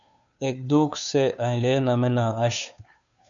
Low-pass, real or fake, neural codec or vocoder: 7.2 kHz; fake; codec, 16 kHz, 2 kbps, FunCodec, trained on Chinese and English, 25 frames a second